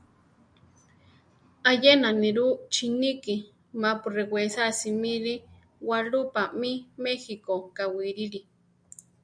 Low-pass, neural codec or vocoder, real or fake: 9.9 kHz; none; real